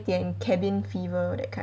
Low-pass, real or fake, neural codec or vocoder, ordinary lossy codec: none; real; none; none